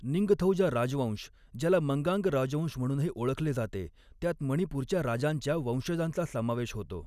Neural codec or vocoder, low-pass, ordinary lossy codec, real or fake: none; 14.4 kHz; none; real